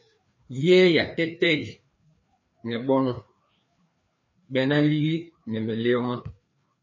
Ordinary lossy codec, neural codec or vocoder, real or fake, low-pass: MP3, 32 kbps; codec, 16 kHz, 2 kbps, FreqCodec, larger model; fake; 7.2 kHz